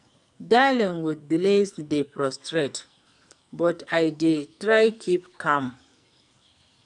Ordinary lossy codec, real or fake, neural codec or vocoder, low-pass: none; fake; codec, 44.1 kHz, 2.6 kbps, SNAC; 10.8 kHz